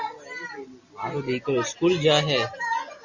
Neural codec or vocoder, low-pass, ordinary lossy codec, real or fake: none; 7.2 kHz; Opus, 64 kbps; real